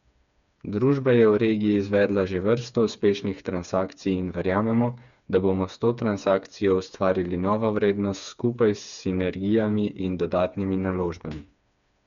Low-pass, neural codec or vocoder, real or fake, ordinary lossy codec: 7.2 kHz; codec, 16 kHz, 4 kbps, FreqCodec, smaller model; fake; none